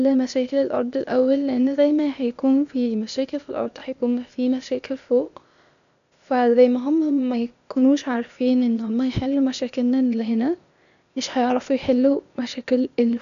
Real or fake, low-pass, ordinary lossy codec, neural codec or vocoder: fake; 7.2 kHz; none; codec, 16 kHz, 0.8 kbps, ZipCodec